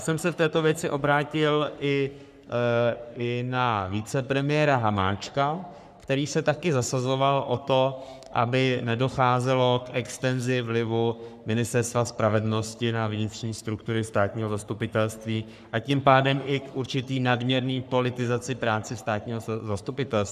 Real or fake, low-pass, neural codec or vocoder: fake; 14.4 kHz; codec, 44.1 kHz, 3.4 kbps, Pupu-Codec